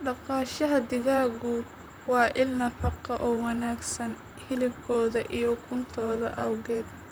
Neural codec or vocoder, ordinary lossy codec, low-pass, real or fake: vocoder, 44.1 kHz, 128 mel bands, Pupu-Vocoder; none; none; fake